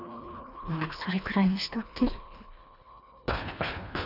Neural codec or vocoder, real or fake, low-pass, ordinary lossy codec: codec, 24 kHz, 1.5 kbps, HILCodec; fake; 5.4 kHz; none